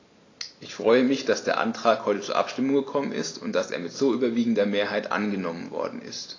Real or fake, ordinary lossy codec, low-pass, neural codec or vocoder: real; AAC, 32 kbps; 7.2 kHz; none